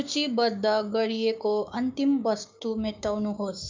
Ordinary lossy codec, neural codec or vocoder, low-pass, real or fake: MP3, 64 kbps; codec, 16 kHz, 6 kbps, DAC; 7.2 kHz; fake